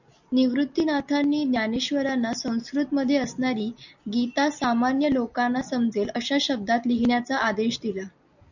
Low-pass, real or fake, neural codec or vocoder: 7.2 kHz; real; none